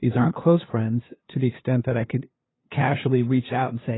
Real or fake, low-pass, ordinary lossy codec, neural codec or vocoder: fake; 7.2 kHz; AAC, 16 kbps; codec, 16 kHz, 2 kbps, FunCodec, trained on LibriTTS, 25 frames a second